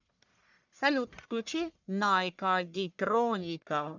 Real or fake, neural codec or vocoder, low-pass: fake; codec, 44.1 kHz, 1.7 kbps, Pupu-Codec; 7.2 kHz